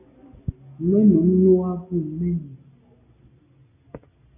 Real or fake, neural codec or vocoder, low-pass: real; none; 3.6 kHz